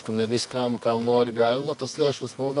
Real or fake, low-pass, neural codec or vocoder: fake; 10.8 kHz; codec, 24 kHz, 0.9 kbps, WavTokenizer, medium music audio release